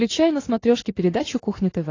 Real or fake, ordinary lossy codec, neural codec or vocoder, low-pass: real; AAC, 32 kbps; none; 7.2 kHz